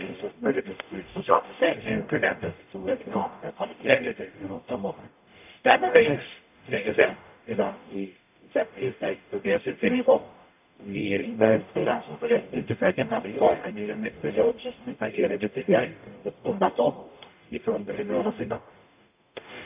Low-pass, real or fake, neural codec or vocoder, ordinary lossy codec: 3.6 kHz; fake; codec, 44.1 kHz, 0.9 kbps, DAC; AAC, 32 kbps